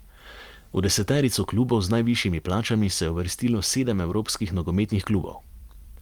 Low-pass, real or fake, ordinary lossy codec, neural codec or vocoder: 19.8 kHz; fake; Opus, 32 kbps; vocoder, 44.1 kHz, 128 mel bands every 256 samples, BigVGAN v2